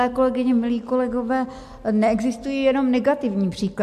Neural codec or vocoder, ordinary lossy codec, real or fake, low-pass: none; MP3, 64 kbps; real; 14.4 kHz